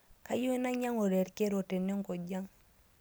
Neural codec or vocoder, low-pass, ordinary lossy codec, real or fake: none; none; none; real